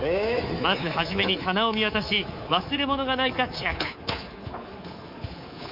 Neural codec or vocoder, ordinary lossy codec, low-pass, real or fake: codec, 24 kHz, 3.1 kbps, DualCodec; Opus, 64 kbps; 5.4 kHz; fake